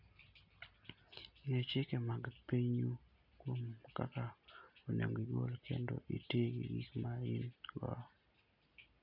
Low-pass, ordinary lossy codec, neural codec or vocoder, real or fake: 5.4 kHz; none; none; real